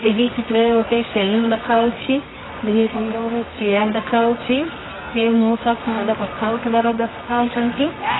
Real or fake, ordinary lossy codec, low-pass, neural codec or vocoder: fake; AAC, 16 kbps; 7.2 kHz; codec, 24 kHz, 0.9 kbps, WavTokenizer, medium music audio release